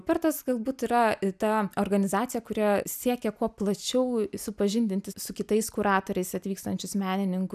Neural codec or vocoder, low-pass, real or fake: none; 14.4 kHz; real